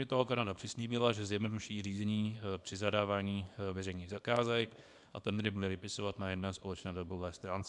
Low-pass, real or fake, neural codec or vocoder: 10.8 kHz; fake; codec, 24 kHz, 0.9 kbps, WavTokenizer, small release